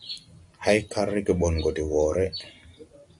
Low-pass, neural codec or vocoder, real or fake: 10.8 kHz; none; real